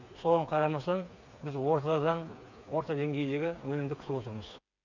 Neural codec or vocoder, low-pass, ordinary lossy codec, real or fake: codec, 16 kHz, 4 kbps, FreqCodec, smaller model; 7.2 kHz; none; fake